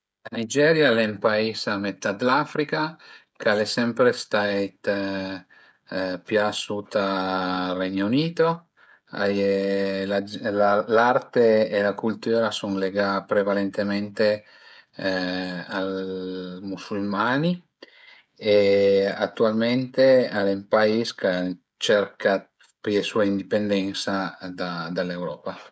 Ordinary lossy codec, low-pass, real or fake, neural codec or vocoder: none; none; fake; codec, 16 kHz, 16 kbps, FreqCodec, smaller model